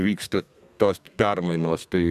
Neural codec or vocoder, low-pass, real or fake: codec, 32 kHz, 1.9 kbps, SNAC; 14.4 kHz; fake